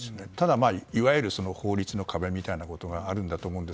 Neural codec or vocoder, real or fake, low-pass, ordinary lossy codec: none; real; none; none